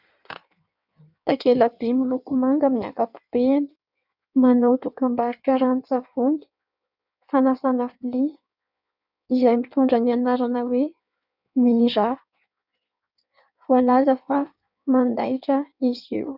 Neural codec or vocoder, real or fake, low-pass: codec, 16 kHz in and 24 kHz out, 1.1 kbps, FireRedTTS-2 codec; fake; 5.4 kHz